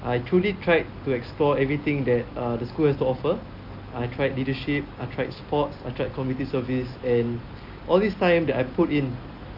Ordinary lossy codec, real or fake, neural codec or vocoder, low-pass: Opus, 24 kbps; real; none; 5.4 kHz